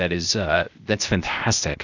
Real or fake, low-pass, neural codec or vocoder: fake; 7.2 kHz; codec, 16 kHz, 0.8 kbps, ZipCodec